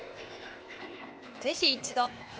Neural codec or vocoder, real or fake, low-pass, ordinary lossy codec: codec, 16 kHz, 0.8 kbps, ZipCodec; fake; none; none